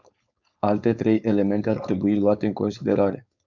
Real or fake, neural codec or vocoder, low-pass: fake; codec, 16 kHz, 4.8 kbps, FACodec; 7.2 kHz